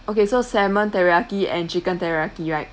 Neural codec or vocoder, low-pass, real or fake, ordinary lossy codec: none; none; real; none